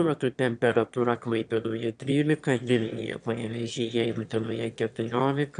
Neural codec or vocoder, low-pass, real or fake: autoencoder, 22.05 kHz, a latent of 192 numbers a frame, VITS, trained on one speaker; 9.9 kHz; fake